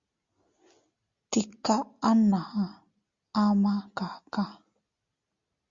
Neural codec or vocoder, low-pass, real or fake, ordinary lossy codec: none; 7.2 kHz; real; Opus, 64 kbps